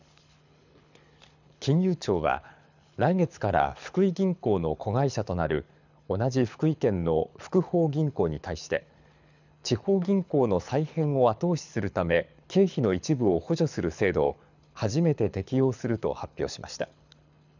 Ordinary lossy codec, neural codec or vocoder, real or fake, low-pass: none; codec, 24 kHz, 6 kbps, HILCodec; fake; 7.2 kHz